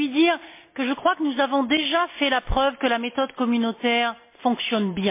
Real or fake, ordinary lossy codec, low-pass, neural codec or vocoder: real; MP3, 24 kbps; 3.6 kHz; none